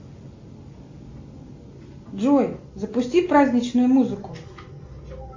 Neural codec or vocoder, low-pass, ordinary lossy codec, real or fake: none; 7.2 kHz; AAC, 48 kbps; real